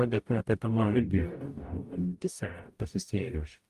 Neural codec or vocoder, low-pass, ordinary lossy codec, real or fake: codec, 44.1 kHz, 0.9 kbps, DAC; 14.4 kHz; Opus, 32 kbps; fake